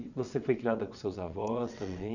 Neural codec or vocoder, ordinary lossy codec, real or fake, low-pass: vocoder, 22.05 kHz, 80 mel bands, WaveNeXt; none; fake; 7.2 kHz